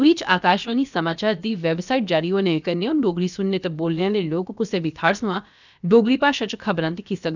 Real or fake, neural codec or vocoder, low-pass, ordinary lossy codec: fake; codec, 16 kHz, about 1 kbps, DyCAST, with the encoder's durations; 7.2 kHz; none